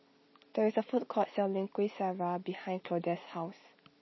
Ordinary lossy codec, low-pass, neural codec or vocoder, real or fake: MP3, 24 kbps; 7.2 kHz; none; real